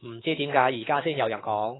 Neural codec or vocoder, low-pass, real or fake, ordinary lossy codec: codec, 24 kHz, 3.1 kbps, DualCodec; 7.2 kHz; fake; AAC, 16 kbps